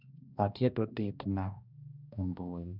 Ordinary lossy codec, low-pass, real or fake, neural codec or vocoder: none; 5.4 kHz; fake; codec, 16 kHz, 1 kbps, X-Codec, HuBERT features, trained on balanced general audio